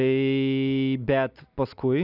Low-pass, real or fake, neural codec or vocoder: 5.4 kHz; real; none